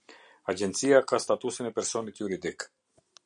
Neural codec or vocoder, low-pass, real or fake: none; 9.9 kHz; real